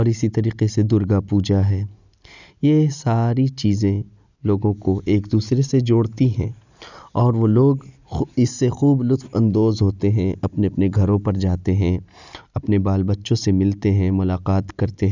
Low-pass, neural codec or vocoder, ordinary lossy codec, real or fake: 7.2 kHz; none; none; real